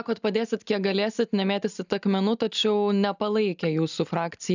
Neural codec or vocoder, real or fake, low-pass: none; real; 7.2 kHz